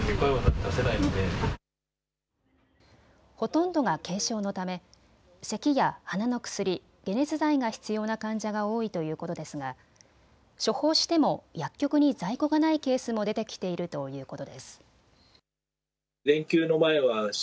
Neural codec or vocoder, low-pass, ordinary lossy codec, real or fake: none; none; none; real